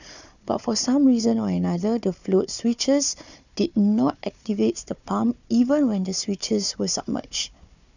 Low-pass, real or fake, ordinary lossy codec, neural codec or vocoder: 7.2 kHz; fake; none; codec, 16 kHz, 4 kbps, FunCodec, trained on Chinese and English, 50 frames a second